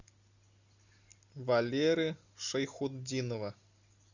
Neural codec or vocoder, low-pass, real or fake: none; 7.2 kHz; real